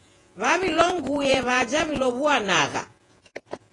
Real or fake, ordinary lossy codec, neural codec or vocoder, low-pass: fake; AAC, 32 kbps; vocoder, 48 kHz, 128 mel bands, Vocos; 10.8 kHz